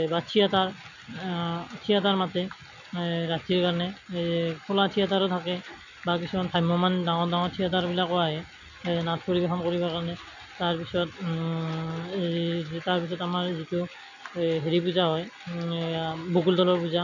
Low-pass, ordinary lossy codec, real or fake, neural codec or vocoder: 7.2 kHz; none; real; none